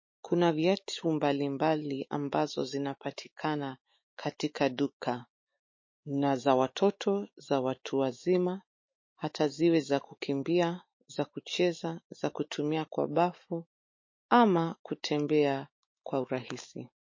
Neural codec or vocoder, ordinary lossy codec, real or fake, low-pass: autoencoder, 48 kHz, 128 numbers a frame, DAC-VAE, trained on Japanese speech; MP3, 32 kbps; fake; 7.2 kHz